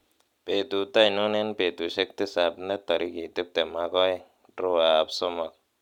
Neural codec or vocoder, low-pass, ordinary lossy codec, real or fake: none; 19.8 kHz; none; real